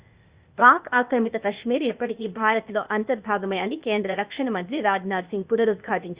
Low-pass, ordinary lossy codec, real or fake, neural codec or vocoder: 3.6 kHz; Opus, 64 kbps; fake; codec, 16 kHz, 0.8 kbps, ZipCodec